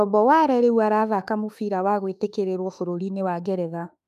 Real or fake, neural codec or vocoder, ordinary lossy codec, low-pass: fake; autoencoder, 48 kHz, 32 numbers a frame, DAC-VAE, trained on Japanese speech; MP3, 96 kbps; 14.4 kHz